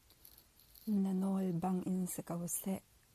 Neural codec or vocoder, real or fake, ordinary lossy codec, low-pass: none; real; MP3, 96 kbps; 14.4 kHz